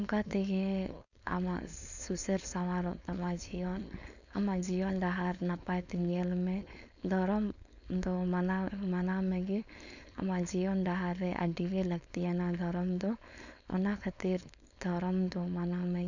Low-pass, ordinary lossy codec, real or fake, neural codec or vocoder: 7.2 kHz; none; fake; codec, 16 kHz, 4.8 kbps, FACodec